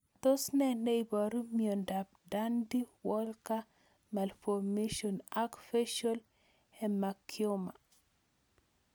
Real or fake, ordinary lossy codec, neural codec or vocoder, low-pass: real; none; none; none